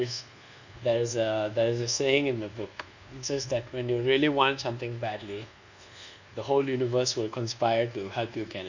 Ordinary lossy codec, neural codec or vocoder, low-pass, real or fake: none; codec, 24 kHz, 1.2 kbps, DualCodec; 7.2 kHz; fake